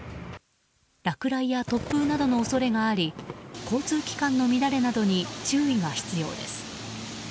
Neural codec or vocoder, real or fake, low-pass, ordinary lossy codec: none; real; none; none